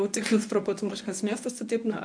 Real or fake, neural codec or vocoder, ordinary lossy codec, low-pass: fake; codec, 24 kHz, 0.9 kbps, WavTokenizer, medium speech release version 1; AAC, 64 kbps; 9.9 kHz